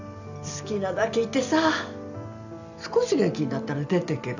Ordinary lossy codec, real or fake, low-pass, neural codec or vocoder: none; fake; 7.2 kHz; vocoder, 44.1 kHz, 128 mel bands every 256 samples, BigVGAN v2